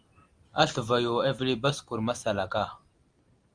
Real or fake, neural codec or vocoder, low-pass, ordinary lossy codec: real; none; 9.9 kHz; Opus, 32 kbps